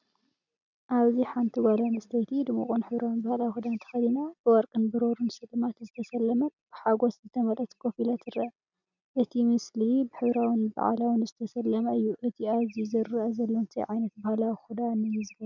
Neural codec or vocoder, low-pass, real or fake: none; 7.2 kHz; real